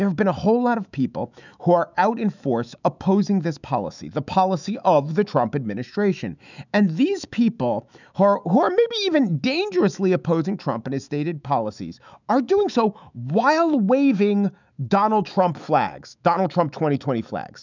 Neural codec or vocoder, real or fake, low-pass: autoencoder, 48 kHz, 128 numbers a frame, DAC-VAE, trained on Japanese speech; fake; 7.2 kHz